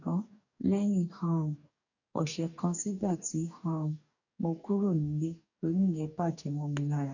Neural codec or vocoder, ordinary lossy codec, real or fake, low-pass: codec, 44.1 kHz, 2.6 kbps, DAC; none; fake; 7.2 kHz